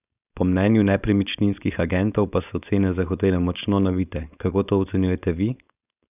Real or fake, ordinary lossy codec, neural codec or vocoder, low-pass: fake; none; codec, 16 kHz, 4.8 kbps, FACodec; 3.6 kHz